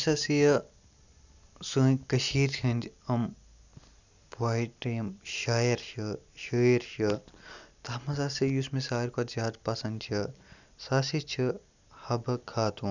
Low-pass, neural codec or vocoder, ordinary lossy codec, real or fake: 7.2 kHz; none; none; real